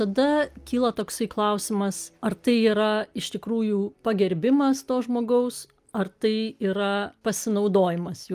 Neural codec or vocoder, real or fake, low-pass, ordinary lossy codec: none; real; 14.4 kHz; Opus, 32 kbps